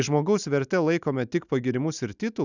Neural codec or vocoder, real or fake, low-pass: none; real; 7.2 kHz